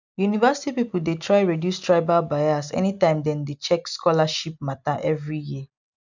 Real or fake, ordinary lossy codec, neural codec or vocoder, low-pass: real; none; none; 7.2 kHz